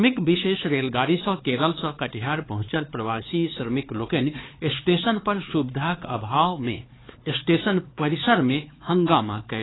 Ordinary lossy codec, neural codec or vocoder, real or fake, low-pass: AAC, 16 kbps; codec, 16 kHz, 4 kbps, X-Codec, HuBERT features, trained on LibriSpeech; fake; 7.2 kHz